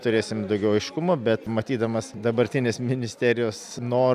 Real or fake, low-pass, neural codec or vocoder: real; 14.4 kHz; none